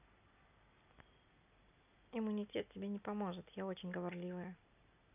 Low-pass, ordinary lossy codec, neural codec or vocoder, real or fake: 3.6 kHz; none; none; real